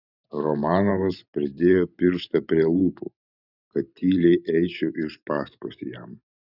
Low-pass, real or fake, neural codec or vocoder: 5.4 kHz; fake; vocoder, 44.1 kHz, 128 mel bands every 512 samples, BigVGAN v2